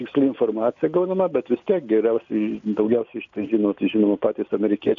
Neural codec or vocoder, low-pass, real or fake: none; 7.2 kHz; real